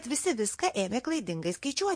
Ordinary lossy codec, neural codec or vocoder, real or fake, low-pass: MP3, 48 kbps; vocoder, 22.05 kHz, 80 mel bands, WaveNeXt; fake; 9.9 kHz